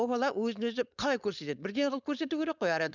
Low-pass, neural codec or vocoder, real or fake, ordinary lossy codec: 7.2 kHz; codec, 16 kHz, 4.8 kbps, FACodec; fake; none